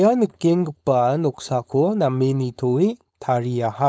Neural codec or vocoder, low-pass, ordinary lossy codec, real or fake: codec, 16 kHz, 4.8 kbps, FACodec; none; none; fake